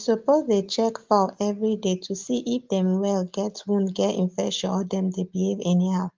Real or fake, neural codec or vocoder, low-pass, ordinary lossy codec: real; none; 7.2 kHz; Opus, 32 kbps